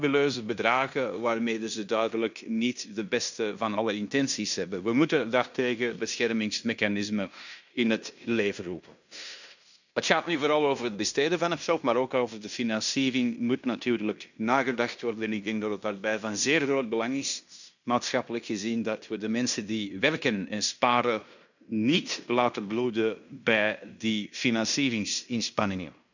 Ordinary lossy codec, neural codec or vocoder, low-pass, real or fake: none; codec, 16 kHz in and 24 kHz out, 0.9 kbps, LongCat-Audio-Codec, fine tuned four codebook decoder; 7.2 kHz; fake